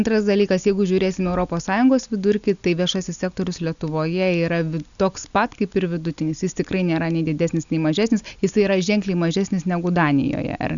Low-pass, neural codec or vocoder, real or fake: 7.2 kHz; none; real